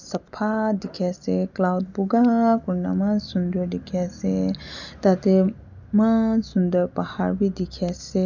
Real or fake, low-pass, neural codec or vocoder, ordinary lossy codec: real; 7.2 kHz; none; none